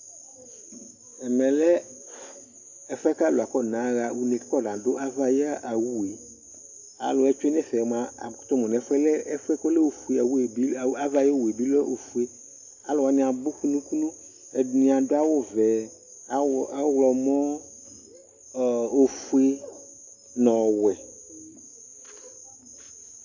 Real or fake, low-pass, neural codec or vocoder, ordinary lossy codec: real; 7.2 kHz; none; AAC, 48 kbps